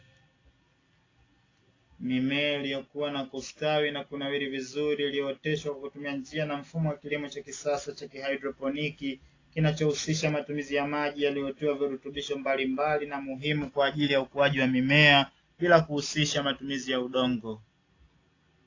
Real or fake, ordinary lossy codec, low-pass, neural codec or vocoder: real; AAC, 32 kbps; 7.2 kHz; none